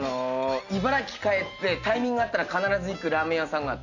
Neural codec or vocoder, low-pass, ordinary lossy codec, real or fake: none; 7.2 kHz; none; real